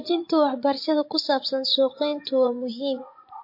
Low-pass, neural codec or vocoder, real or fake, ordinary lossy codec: 5.4 kHz; vocoder, 24 kHz, 100 mel bands, Vocos; fake; MP3, 32 kbps